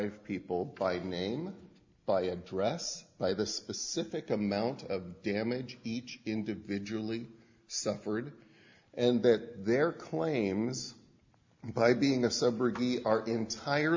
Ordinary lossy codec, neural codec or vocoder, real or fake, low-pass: MP3, 64 kbps; none; real; 7.2 kHz